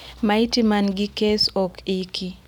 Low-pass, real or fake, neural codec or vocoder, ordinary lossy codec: 19.8 kHz; real; none; none